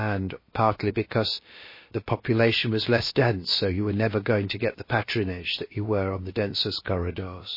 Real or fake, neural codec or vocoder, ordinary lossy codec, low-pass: fake; codec, 16 kHz, about 1 kbps, DyCAST, with the encoder's durations; MP3, 24 kbps; 5.4 kHz